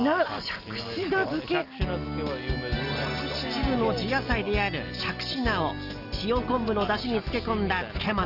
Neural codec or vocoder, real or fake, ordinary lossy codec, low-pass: none; real; Opus, 32 kbps; 5.4 kHz